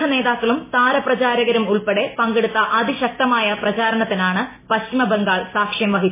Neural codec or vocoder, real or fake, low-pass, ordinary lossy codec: none; real; 3.6 kHz; MP3, 16 kbps